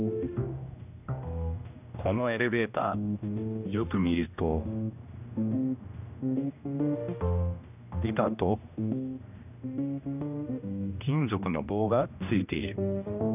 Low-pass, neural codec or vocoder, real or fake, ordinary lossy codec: 3.6 kHz; codec, 16 kHz, 1 kbps, X-Codec, HuBERT features, trained on general audio; fake; none